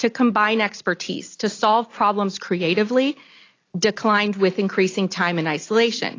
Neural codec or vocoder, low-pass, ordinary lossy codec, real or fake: none; 7.2 kHz; AAC, 32 kbps; real